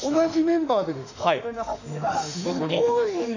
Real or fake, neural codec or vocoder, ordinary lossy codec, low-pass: fake; autoencoder, 48 kHz, 32 numbers a frame, DAC-VAE, trained on Japanese speech; none; 7.2 kHz